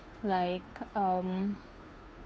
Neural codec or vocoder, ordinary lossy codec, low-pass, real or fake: codec, 16 kHz, 2 kbps, FunCodec, trained on Chinese and English, 25 frames a second; none; none; fake